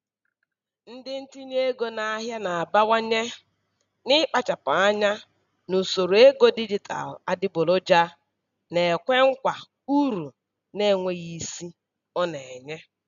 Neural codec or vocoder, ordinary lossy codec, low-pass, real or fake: none; none; 7.2 kHz; real